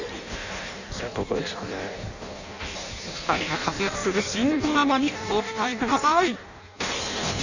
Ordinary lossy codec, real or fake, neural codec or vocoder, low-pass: none; fake; codec, 16 kHz in and 24 kHz out, 0.6 kbps, FireRedTTS-2 codec; 7.2 kHz